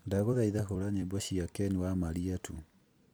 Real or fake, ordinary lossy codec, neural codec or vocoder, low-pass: fake; none; vocoder, 44.1 kHz, 128 mel bands every 256 samples, BigVGAN v2; none